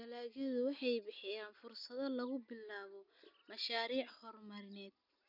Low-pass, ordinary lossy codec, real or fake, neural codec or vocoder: 5.4 kHz; none; real; none